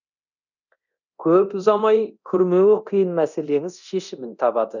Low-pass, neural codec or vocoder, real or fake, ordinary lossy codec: 7.2 kHz; codec, 24 kHz, 0.9 kbps, DualCodec; fake; none